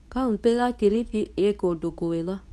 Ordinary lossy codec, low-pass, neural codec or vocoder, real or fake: none; none; codec, 24 kHz, 0.9 kbps, WavTokenizer, medium speech release version 2; fake